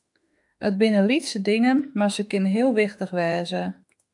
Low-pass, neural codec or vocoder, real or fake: 10.8 kHz; autoencoder, 48 kHz, 32 numbers a frame, DAC-VAE, trained on Japanese speech; fake